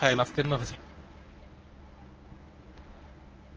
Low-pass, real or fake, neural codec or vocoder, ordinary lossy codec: 7.2 kHz; fake; codec, 24 kHz, 0.9 kbps, WavTokenizer, medium speech release version 1; Opus, 24 kbps